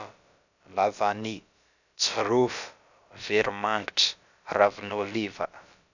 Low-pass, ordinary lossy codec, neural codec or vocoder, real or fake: 7.2 kHz; none; codec, 16 kHz, about 1 kbps, DyCAST, with the encoder's durations; fake